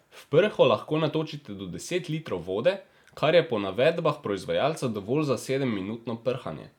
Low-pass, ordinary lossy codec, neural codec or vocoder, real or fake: 19.8 kHz; none; none; real